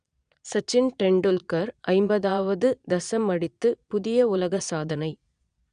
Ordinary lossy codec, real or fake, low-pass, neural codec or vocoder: none; fake; 9.9 kHz; vocoder, 22.05 kHz, 80 mel bands, Vocos